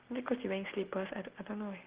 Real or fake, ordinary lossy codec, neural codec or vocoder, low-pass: real; Opus, 16 kbps; none; 3.6 kHz